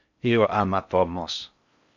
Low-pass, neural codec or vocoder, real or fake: 7.2 kHz; codec, 16 kHz in and 24 kHz out, 0.6 kbps, FocalCodec, streaming, 4096 codes; fake